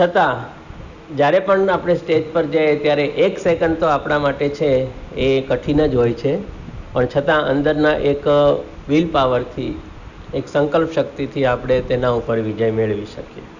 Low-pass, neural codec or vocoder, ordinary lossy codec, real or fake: 7.2 kHz; none; none; real